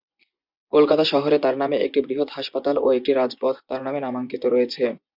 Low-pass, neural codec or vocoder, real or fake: 5.4 kHz; vocoder, 24 kHz, 100 mel bands, Vocos; fake